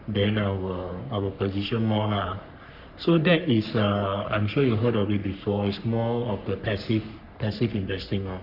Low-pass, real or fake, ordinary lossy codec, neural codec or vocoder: 5.4 kHz; fake; Opus, 64 kbps; codec, 44.1 kHz, 3.4 kbps, Pupu-Codec